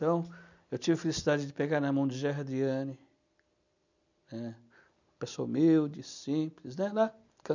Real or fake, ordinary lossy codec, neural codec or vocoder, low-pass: real; none; none; 7.2 kHz